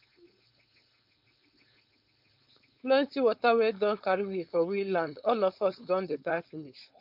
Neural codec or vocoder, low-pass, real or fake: codec, 16 kHz, 4.8 kbps, FACodec; 5.4 kHz; fake